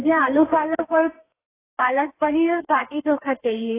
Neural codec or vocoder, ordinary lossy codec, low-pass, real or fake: codec, 32 kHz, 1.9 kbps, SNAC; AAC, 16 kbps; 3.6 kHz; fake